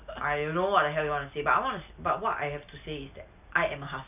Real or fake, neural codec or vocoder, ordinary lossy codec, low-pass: real; none; none; 3.6 kHz